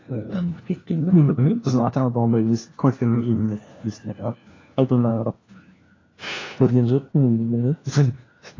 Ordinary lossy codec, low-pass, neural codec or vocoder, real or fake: AAC, 32 kbps; 7.2 kHz; codec, 16 kHz, 1 kbps, FunCodec, trained on LibriTTS, 50 frames a second; fake